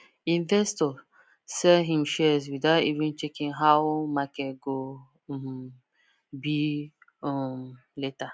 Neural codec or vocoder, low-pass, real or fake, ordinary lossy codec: none; none; real; none